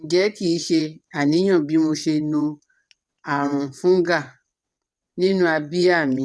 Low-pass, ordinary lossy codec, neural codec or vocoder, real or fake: none; none; vocoder, 22.05 kHz, 80 mel bands, WaveNeXt; fake